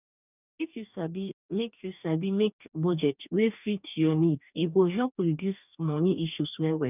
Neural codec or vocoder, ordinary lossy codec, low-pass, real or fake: codec, 44.1 kHz, 2.6 kbps, DAC; none; 3.6 kHz; fake